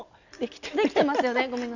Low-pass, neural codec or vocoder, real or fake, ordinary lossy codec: 7.2 kHz; none; real; none